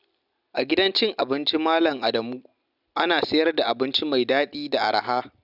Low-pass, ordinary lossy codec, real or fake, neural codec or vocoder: 5.4 kHz; none; real; none